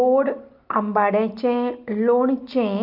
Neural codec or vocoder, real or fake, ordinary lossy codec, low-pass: none; real; Opus, 32 kbps; 5.4 kHz